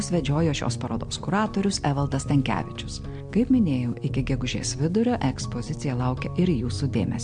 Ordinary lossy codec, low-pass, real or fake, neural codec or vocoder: MP3, 64 kbps; 9.9 kHz; real; none